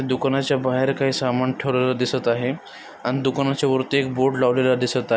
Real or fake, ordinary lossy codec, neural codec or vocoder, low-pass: real; none; none; none